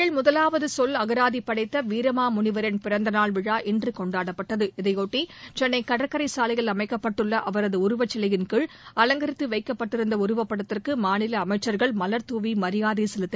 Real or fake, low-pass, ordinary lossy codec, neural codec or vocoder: real; none; none; none